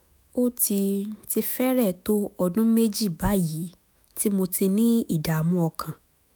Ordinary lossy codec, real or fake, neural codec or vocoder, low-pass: none; fake; autoencoder, 48 kHz, 128 numbers a frame, DAC-VAE, trained on Japanese speech; none